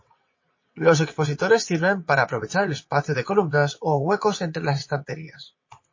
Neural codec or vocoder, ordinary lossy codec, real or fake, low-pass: vocoder, 22.05 kHz, 80 mel bands, WaveNeXt; MP3, 32 kbps; fake; 7.2 kHz